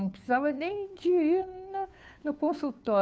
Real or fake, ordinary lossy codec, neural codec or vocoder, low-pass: fake; none; codec, 16 kHz, 2 kbps, FunCodec, trained on Chinese and English, 25 frames a second; none